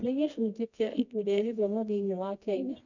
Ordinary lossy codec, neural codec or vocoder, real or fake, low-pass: none; codec, 24 kHz, 0.9 kbps, WavTokenizer, medium music audio release; fake; 7.2 kHz